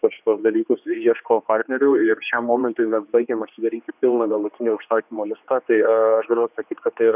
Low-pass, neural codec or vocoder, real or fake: 3.6 kHz; codec, 16 kHz, 2 kbps, X-Codec, HuBERT features, trained on general audio; fake